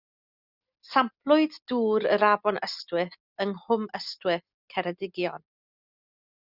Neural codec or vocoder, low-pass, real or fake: none; 5.4 kHz; real